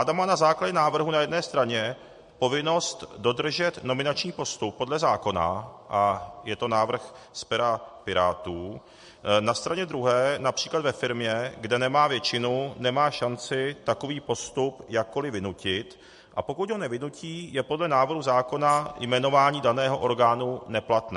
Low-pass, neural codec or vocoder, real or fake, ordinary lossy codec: 14.4 kHz; none; real; MP3, 48 kbps